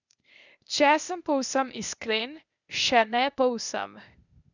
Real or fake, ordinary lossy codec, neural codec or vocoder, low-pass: fake; none; codec, 16 kHz, 0.8 kbps, ZipCodec; 7.2 kHz